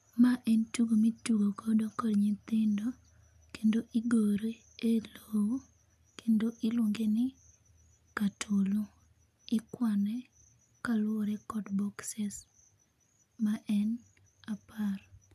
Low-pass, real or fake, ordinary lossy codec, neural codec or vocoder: 14.4 kHz; real; none; none